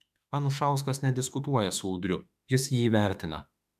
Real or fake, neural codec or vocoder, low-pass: fake; autoencoder, 48 kHz, 32 numbers a frame, DAC-VAE, trained on Japanese speech; 14.4 kHz